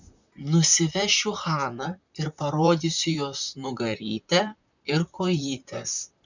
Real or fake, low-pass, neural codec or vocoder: fake; 7.2 kHz; vocoder, 44.1 kHz, 128 mel bands, Pupu-Vocoder